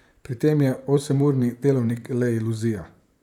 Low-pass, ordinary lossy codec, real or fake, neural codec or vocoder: 19.8 kHz; none; fake; vocoder, 44.1 kHz, 128 mel bands, Pupu-Vocoder